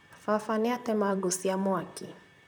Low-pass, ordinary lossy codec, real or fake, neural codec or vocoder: none; none; fake; vocoder, 44.1 kHz, 128 mel bands, Pupu-Vocoder